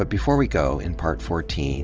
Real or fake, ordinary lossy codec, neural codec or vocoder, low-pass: real; Opus, 24 kbps; none; 7.2 kHz